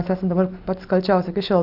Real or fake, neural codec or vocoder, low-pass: real; none; 5.4 kHz